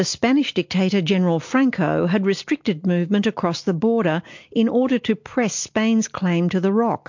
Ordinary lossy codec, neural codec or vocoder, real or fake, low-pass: MP3, 48 kbps; none; real; 7.2 kHz